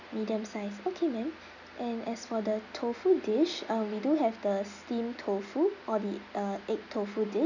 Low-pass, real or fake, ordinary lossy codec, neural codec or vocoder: 7.2 kHz; real; none; none